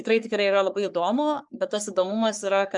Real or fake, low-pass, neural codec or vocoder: fake; 10.8 kHz; codec, 44.1 kHz, 3.4 kbps, Pupu-Codec